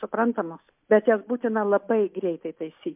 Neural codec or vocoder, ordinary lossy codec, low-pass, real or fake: none; MP3, 32 kbps; 3.6 kHz; real